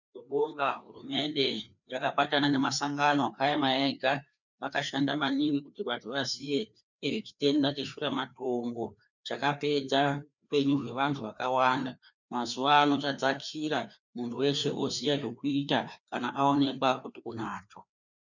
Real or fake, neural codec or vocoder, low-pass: fake; codec, 16 kHz, 2 kbps, FreqCodec, larger model; 7.2 kHz